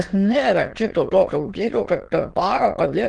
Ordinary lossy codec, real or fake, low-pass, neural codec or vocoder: Opus, 16 kbps; fake; 9.9 kHz; autoencoder, 22.05 kHz, a latent of 192 numbers a frame, VITS, trained on many speakers